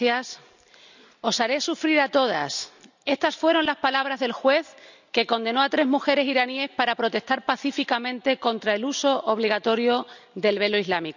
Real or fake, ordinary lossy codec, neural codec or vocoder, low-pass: real; none; none; 7.2 kHz